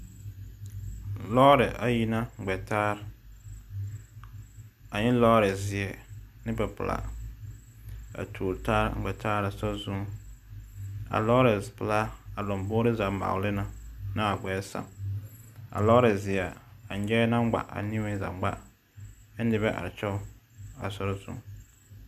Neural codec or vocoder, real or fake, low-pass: none; real; 14.4 kHz